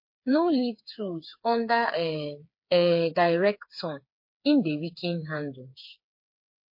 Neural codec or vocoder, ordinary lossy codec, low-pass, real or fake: codec, 16 kHz, 4 kbps, FreqCodec, smaller model; MP3, 32 kbps; 5.4 kHz; fake